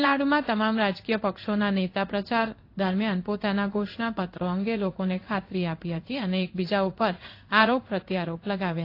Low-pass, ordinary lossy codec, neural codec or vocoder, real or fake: 5.4 kHz; AAC, 32 kbps; codec, 16 kHz in and 24 kHz out, 1 kbps, XY-Tokenizer; fake